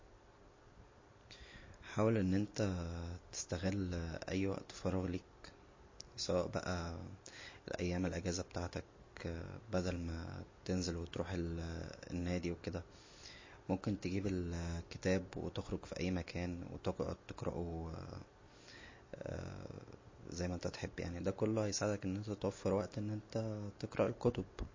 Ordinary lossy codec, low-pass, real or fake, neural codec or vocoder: MP3, 32 kbps; 7.2 kHz; real; none